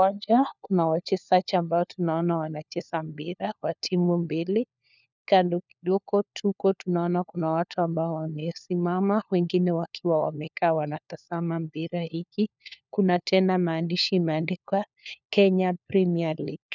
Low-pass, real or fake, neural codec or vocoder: 7.2 kHz; fake; codec, 16 kHz, 4 kbps, FunCodec, trained on LibriTTS, 50 frames a second